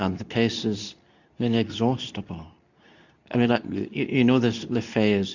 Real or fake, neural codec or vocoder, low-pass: fake; codec, 24 kHz, 0.9 kbps, WavTokenizer, medium speech release version 2; 7.2 kHz